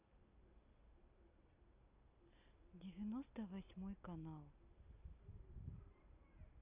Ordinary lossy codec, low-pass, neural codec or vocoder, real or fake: none; 3.6 kHz; none; real